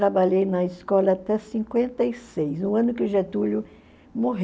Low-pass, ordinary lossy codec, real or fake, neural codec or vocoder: none; none; real; none